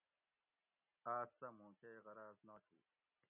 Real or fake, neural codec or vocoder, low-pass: real; none; 3.6 kHz